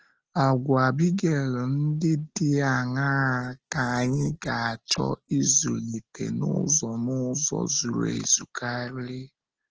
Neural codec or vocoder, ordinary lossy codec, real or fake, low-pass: none; Opus, 16 kbps; real; 7.2 kHz